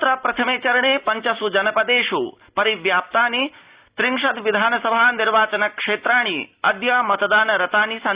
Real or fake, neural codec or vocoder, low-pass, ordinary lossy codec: real; none; 3.6 kHz; Opus, 64 kbps